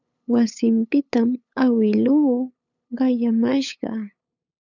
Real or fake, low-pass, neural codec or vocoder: fake; 7.2 kHz; codec, 16 kHz, 8 kbps, FunCodec, trained on LibriTTS, 25 frames a second